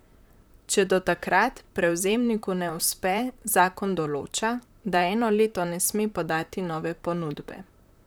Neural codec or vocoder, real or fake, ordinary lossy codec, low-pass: vocoder, 44.1 kHz, 128 mel bands, Pupu-Vocoder; fake; none; none